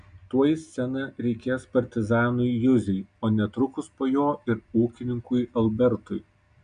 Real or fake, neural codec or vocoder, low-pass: real; none; 9.9 kHz